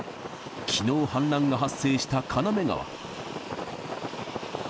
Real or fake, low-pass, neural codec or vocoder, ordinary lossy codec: real; none; none; none